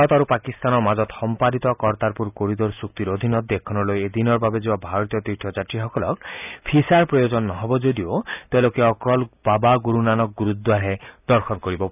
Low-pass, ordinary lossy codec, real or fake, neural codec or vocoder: 3.6 kHz; none; real; none